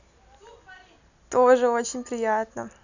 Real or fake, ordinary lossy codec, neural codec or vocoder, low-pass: real; none; none; 7.2 kHz